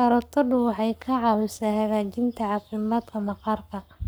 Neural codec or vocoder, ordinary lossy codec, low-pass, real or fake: codec, 44.1 kHz, 7.8 kbps, Pupu-Codec; none; none; fake